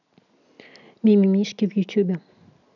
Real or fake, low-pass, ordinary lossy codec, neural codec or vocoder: real; 7.2 kHz; none; none